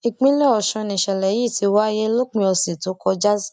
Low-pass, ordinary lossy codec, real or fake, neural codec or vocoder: 10.8 kHz; none; real; none